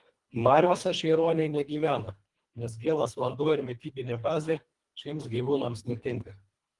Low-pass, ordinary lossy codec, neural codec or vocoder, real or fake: 10.8 kHz; Opus, 24 kbps; codec, 24 kHz, 1.5 kbps, HILCodec; fake